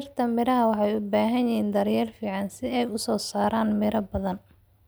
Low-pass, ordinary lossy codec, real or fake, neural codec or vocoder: none; none; real; none